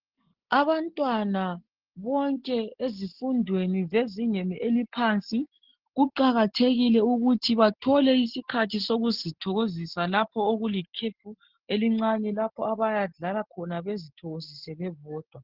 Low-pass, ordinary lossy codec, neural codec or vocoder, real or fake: 5.4 kHz; Opus, 16 kbps; none; real